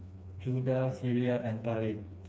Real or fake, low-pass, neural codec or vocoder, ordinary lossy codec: fake; none; codec, 16 kHz, 2 kbps, FreqCodec, smaller model; none